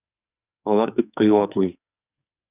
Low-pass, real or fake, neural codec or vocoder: 3.6 kHz; fake; codec, 44.1 kHz, 2.6 kbps, SNAC